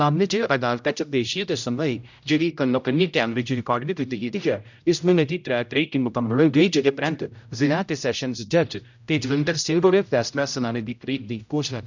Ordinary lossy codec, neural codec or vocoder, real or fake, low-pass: none; codec, 16 kHz, 0.5 kbps, X-Codec, HuBERT features, trained on general audio; fake; 7.2 kHz